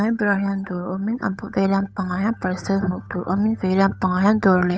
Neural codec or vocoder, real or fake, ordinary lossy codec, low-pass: codec, 16 kHz, 8 kbps, FunCodec, trained on Chinese and English, 25 frames a second; fake; none; none